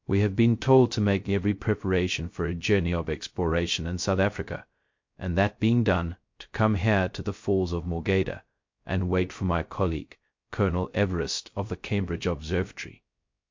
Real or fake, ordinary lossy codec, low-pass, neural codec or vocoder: fake; MP3, 48 kbps; 7.2 kHz; codec, 16 kHz, 0.2 kbps, FocalCodec